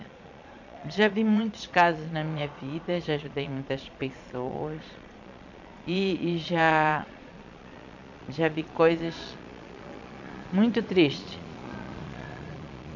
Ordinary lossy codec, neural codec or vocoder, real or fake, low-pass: none; vocoder, 22.05 kHz, 80 mel bands, Vocos; fake; 7.2 kHz